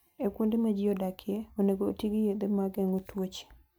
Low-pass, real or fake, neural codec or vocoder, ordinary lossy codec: none; real; none; none